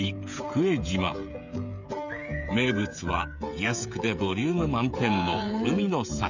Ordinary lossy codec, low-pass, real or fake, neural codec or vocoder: none; 7.2 kHz; fake; codec, 16 kHz, 16 kbps, FreqCodec, smaller model